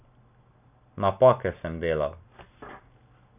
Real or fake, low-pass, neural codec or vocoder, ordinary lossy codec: real; 3.6 kHz; none; none